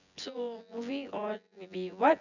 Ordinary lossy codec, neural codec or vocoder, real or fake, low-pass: none; vocoder, 24 kHz, 100 mel bands, Vocos; fake; 7.2 kHz